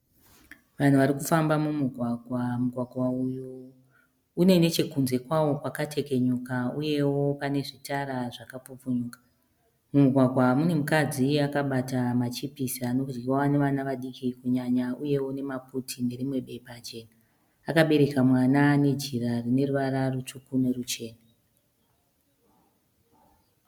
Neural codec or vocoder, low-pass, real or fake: none; 19.8 kHz; real